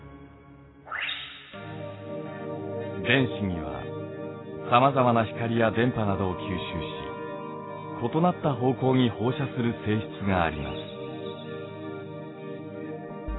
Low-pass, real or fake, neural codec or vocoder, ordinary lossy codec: 7.2 kHz; real; none; AAC, 16 kbps